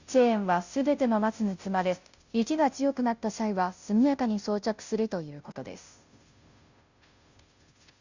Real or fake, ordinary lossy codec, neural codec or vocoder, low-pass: fake; Opus, 64 kbps; codec, 16 kHz, 0.5 kbps, FunCodec, trained on Chinese and English, 25 frames a second; 7.2 kHz